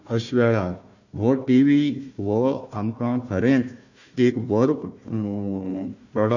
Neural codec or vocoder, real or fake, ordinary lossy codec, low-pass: codec, 16 kHz, 1 kbps, FunCodec, trained on Chinese and English, 50 frames a second; fake; none; 7.2 kHz